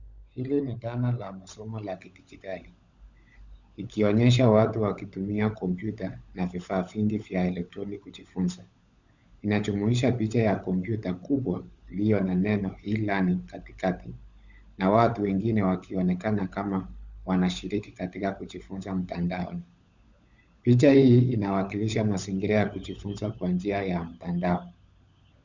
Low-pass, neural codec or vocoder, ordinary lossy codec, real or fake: 7.2 kHz; codec, 16 kHz, 16 kbps, FunCodec, trained on LibriTTS, 50 frames a second; Opus, 64 kbps; fake